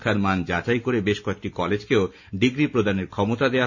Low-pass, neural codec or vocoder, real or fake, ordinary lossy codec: 7.2 kHz; none; real; none